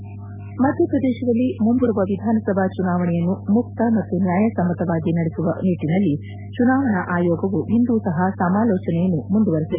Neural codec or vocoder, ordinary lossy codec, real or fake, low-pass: none; none; real; 3.6 kHz